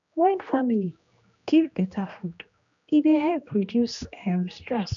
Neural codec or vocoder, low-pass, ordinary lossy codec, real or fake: codec, 16 kHz, 1 kbps, X-Codec, HuBERT features, trained on general audio; 7.2 kHz; none; fake